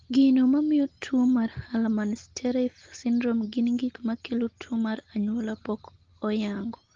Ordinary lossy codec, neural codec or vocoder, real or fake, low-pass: Opus, 16 kbps; none; real; 7.2 kHz